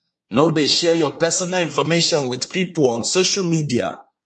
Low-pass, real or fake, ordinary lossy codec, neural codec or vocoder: 10.8 kHz; fake; MP3, 48 kbps; codec, 24 kHz, 1 kbps, SNAC